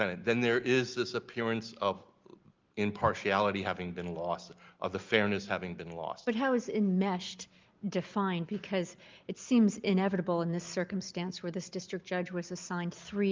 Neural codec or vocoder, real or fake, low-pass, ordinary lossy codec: none; real; 7.2 kHz; Opus, 32 kbps